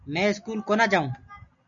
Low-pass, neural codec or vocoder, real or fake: 7.2 kHz; none; real